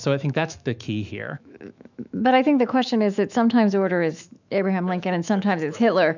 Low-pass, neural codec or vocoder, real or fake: 7.2 kHz; autoencoder, 48 kHz, 128 numbers a frame, DAC-VAE, trained on Japanese speech; fake